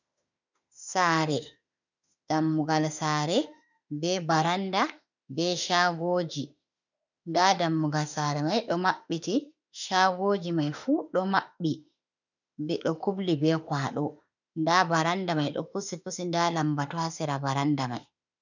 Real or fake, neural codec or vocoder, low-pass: fake; autoencoder, 48 kHz, 32 numbers a frame, DAC-VAE, trained on Japanese speech; 7.2 kHz